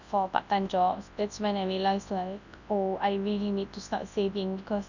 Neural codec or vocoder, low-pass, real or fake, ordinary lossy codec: codec, 24 kHz, 0.9 kbps, WavTokenizer, large speech release; 7.2 kHz; fake; none